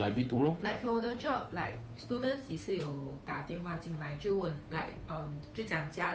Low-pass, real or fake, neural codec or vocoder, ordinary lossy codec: none; fake; codec, 16 kHz, 2 kbps, FunCodec, trained on Chinese and English, 25 frames a second; none